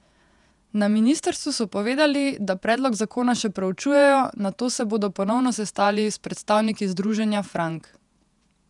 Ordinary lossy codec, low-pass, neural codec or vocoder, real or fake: none; 10.8 kHz; vocoder, 48 kHz, 128 mel bands, Vocos; fake